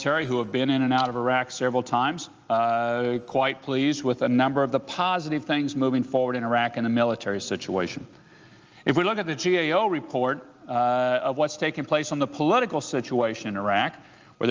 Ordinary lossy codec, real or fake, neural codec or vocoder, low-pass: Opus, 24 kbps; real; none; 7.2 kHz